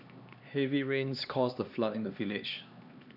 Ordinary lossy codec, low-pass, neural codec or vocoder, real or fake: none; 5.4 kHz; codec, 16 kHz, 2 kbps, X-Codec, HuBERT features, trained on LibriSpeech; fake